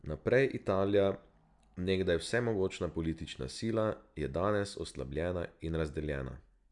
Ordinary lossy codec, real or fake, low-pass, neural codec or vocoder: none; real; 10.8 kHz; none